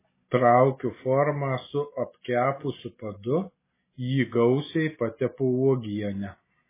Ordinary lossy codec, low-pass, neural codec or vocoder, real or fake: MP3, 16 kbps; 3.6 kHz; none; real